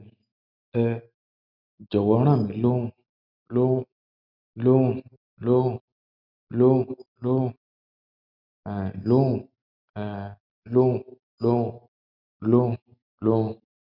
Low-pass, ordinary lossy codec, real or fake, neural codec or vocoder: 5.4 kHz; none; real; none